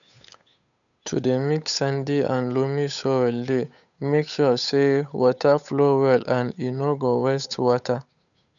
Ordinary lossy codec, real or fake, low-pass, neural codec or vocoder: none; fake; 7.2 kHz; codec, 16 kHz, 8 kbps, FunCodec, trained on Chinese and English, 25 frames a second